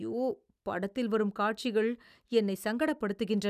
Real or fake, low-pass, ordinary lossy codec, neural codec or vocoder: real; 14.4 kHz; none; none